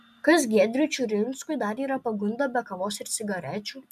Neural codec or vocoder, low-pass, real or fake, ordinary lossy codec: none; 14.4 kHz; real; MP3, 96 kbps